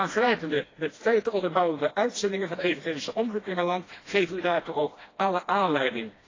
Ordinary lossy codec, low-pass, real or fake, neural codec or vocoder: AAC, 32 kbps; 7.2 kHz; fake; codec, 16 kHz, 1 kbps, FreqCodec, smaller model